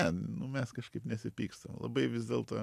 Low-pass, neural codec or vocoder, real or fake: 14.4 kHz; none; real